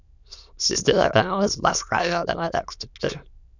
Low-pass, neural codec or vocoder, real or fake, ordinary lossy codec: 7.2 kHz; autoencoder, 22.05 kHz, a latent of 192 numbers a frame, VITS, trained on many speakers; fake; none